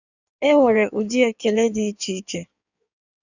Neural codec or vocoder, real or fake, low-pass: codec, 16 kHz in and 24 kHz out, 1.1 kbps, FireRedTTS-2 codec; fake; 7.2 kHz